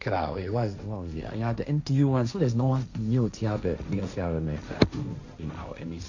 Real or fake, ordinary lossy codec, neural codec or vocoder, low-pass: fake; none; codec, 16 kHz, 1.1 kbps, Voila-Tokenizer; 7.2 kHz